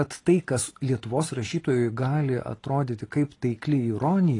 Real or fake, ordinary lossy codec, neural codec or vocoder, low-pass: real; AAC, 32 kbps; none; 10.8 kHz